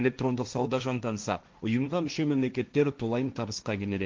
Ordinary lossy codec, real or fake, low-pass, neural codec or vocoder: Opus, 32 kbps; fake; 7.2 kHz; codec, 16 kHz, 1.1 kbps, Voila-Tokenizer